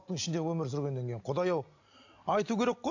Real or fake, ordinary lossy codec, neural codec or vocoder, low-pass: real; AAC, 48 kbps; none; 7.2 kHz